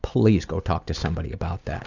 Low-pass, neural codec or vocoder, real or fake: 7.2 kHz; none; real